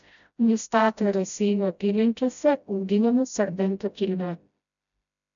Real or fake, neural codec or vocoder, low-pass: fake; codec, 16 kHz, 0.5 kbps, FreqCodec, smaller model; 7.2 kHz